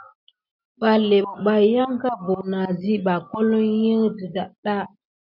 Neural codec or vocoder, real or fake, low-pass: none; real; 5.4 kHz